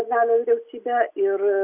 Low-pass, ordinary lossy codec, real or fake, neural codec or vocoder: 3.6 kHz; Opus, 64 kbps; real; none